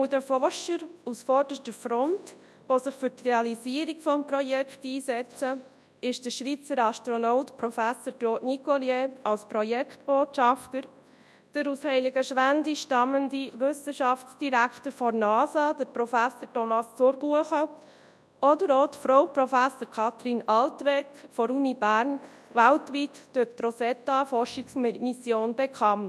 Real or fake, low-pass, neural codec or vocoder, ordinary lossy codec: fake; none; codec, 24 kHz, 0.9 kbps, WavTokenizer, large speech release; none